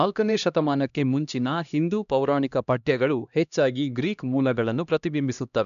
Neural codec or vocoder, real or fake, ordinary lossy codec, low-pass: codec, 16 kHz, 1 kbps, X-Codec, HuBERT features, trained on LibriSpeech; fake; none; 7.2 kHz